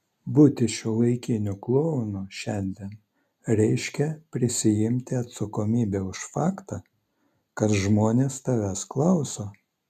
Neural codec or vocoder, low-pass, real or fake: none; 14.4 kHz; real